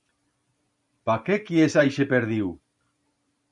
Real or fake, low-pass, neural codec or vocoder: fake; 10.8 kHz; vocoder, 44.1 kHz, 128 mel bands every 512 samples, BigVGAN v2